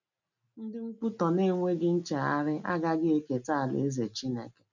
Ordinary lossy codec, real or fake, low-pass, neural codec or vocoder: none; real; 7.2 kHz; none